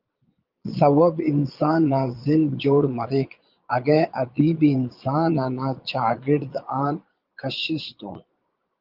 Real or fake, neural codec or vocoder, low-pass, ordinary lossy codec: fake; vocoder, 22.05 kHz, 80 mel bands, Vocos; 5.4 kHz; Opus, 32 kbps